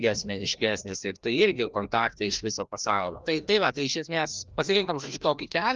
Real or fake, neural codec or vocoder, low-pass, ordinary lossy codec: fake; codec, 16 kHz, 1 kbps, FreqCodec, larger model; 7.2 kHz; Opus, 32 kbps